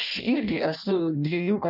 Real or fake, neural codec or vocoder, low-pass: fake; codec, 16 kHz in and 24 kHz out, 0.6 kbps, FireRedTTS-2 codec; 5.4 kHz